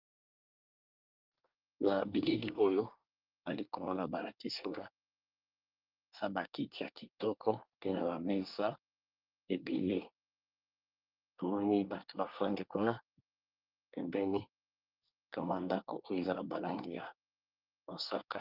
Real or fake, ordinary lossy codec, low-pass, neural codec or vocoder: fake; Opus, 24 kbps; 5.4 kHz; codec, 24 kHz, 1 kbps, SNAC